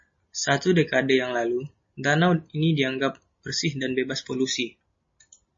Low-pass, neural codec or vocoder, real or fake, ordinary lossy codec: 7.2 kHz; none; real; MP3, 32 kbps